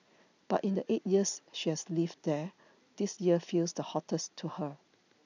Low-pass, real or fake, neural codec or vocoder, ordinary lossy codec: 7.2 kHz; real; none; none